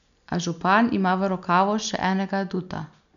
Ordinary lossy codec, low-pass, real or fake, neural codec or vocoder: none; 7.2 kHz; real; none